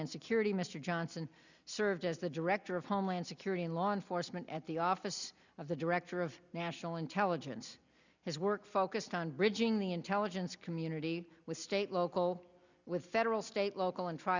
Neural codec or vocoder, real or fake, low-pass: none; real; 7.2 kHz